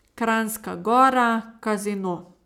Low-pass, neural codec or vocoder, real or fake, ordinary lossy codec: 19.8 kHz; none; real; none